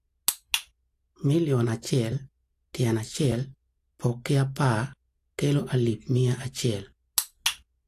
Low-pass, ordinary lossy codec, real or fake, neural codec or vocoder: 14.4 kHz; AAC, 64 kbps; real; none